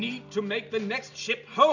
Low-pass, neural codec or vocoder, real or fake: 7.2 kHz; none; real